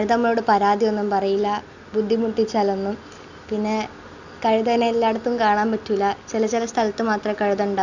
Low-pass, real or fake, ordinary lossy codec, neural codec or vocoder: 7.2 kHz; real; none; none